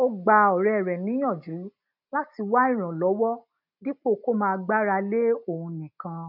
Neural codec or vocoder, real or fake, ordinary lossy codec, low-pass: none; real; none; 5.4 kHz